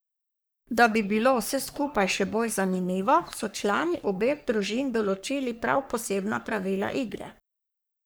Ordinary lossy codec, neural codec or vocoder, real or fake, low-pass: none; codec, 44.1 kHz, 3.4 kbps, Pupu-Codec; fake; none